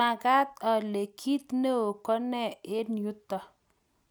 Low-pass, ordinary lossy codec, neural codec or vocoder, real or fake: none; none; none; real